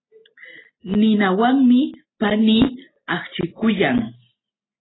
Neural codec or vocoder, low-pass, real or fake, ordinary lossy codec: none; 7.2 kHz; real; AAC, 16 kbps